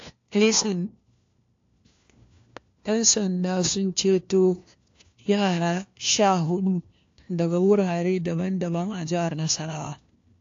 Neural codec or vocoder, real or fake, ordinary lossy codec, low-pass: codec, 16 kHz, 1 kbps, FunCodec, trained on LibriTTS, 50 frames a second; fake; AAC, 48 kbps; 7.2 kHz